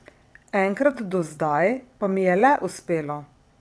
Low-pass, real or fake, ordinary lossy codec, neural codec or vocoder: none; fake; none; vocoder, 22.05 kHz, 80 mel bands, WaveNeXt